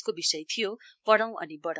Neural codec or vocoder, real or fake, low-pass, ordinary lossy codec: codec, 16 kHz, 4 kbps, X-Codec, WavLM features, trained on Multilingual LibriSpeech; fake; none; none